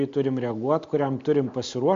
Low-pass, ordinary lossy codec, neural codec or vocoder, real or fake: 7.2 kHz; MP3, 48 kbps; none; real